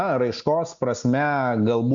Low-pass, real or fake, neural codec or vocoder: 7.2 kHz; real; none